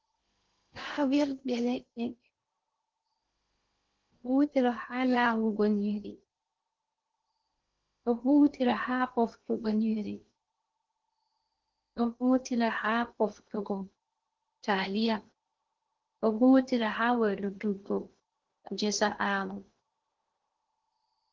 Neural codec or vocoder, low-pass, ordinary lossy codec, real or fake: codec, 16 kHz in and 24 kHz out, 0.8 kbps, FocalCodec, streaming, 65536 codes; 7.2 kHz; Opus, 16 kbps; fake